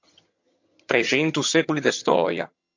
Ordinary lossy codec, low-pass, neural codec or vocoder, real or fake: MP3, 48 kbps; 7.2 kHz; vocoder, 22.05 kHz, 80 mel bands, HiFi-GAN; fake